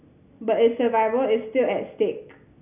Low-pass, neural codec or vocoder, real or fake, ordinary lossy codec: 3.6 kHz; none; real; none